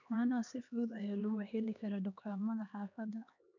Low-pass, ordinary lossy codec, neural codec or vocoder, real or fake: 7.2 kHz; none; codec, 16 kHz, 2 kbps, X-Codec, HuBERT features, trained on LibriSpeech; fake